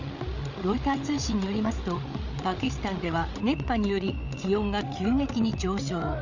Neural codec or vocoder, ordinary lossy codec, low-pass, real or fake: codec, 16 kHz, 8 kbps, FreqCodec, larger model; none; 7.2 kHz; fake